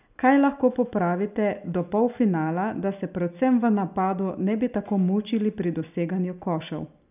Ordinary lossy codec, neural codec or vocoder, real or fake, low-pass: none; none; real; 3.6 kHz